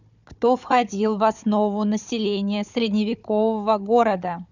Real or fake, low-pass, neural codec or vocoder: fake; 7.2 kHz; codec, 16 kHz, 4 kbps, FunCodec, trained on Chinese and English, 50 frames a second